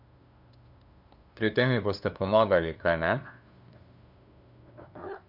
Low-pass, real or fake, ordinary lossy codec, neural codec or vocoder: 5.4 kHz; fake; none; codec, 16 kHz, 2 kbps, FunCodec, trained on LibriTTS, 25 frames a second